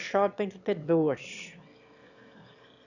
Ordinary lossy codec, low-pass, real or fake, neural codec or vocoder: none; 7.2 kHz; fake; autoencoder, 22.05 kHz, a latent of 192 numbers a frame, VITS, trained on one speaker